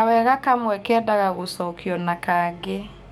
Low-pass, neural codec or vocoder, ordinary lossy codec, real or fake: 19.8 kHz; codec, 44.1 kHz, 7.8 kbps, DAC; none; fake